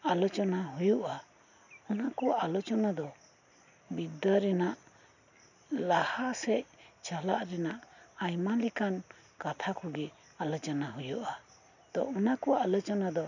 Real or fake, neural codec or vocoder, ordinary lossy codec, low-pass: real; none; none; 7.2 kHz